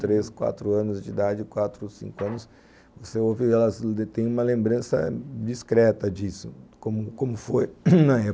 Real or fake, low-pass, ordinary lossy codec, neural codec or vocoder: real; none; none; none